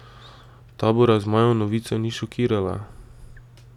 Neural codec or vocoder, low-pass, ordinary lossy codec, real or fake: none; 19.8 kHz; none; real